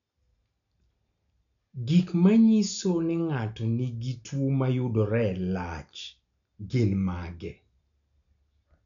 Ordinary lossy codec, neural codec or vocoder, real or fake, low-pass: none; none; real; 7.2 kHz